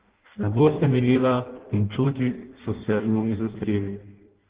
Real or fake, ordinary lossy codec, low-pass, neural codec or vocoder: fake; Opus, 16 kbps; 3.6 kHz; codec, 16 kHz in and 24 kHz out, 0.6 kbps, FireRedTTS-2 codec